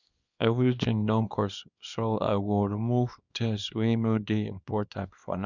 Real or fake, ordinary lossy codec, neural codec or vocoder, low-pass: fake; none; codec, 24 kHz, 0.9 kbps, WavTokenizer, small release; 7.2 kHz